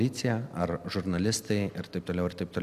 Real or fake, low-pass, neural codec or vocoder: real; 14.4 kHz; none